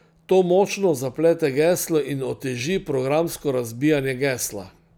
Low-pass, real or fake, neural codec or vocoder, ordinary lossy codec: none; real; none; none